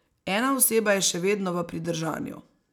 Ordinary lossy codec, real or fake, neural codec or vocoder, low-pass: none; real; none; 19.8 kHz